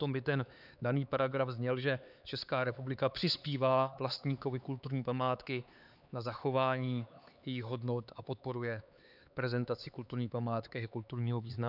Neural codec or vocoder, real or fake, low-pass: codec, 16 kHz, 4 kbps, X-Codec, HuBERT features, trained on LibriSpeech; fake; 5.4 kHz